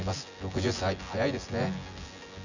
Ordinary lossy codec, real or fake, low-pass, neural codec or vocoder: none; fake; 7.2 kHz; vocoder, 24 kHz, 100 mel bands, Vocos